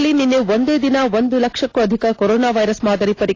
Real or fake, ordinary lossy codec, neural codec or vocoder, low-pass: real; AAC, 32 kbps; none; 7.2 kHz